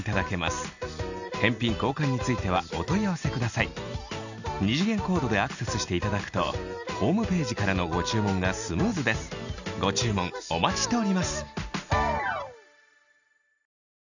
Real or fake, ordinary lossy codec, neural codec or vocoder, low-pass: real; none; none; 7.2 kHz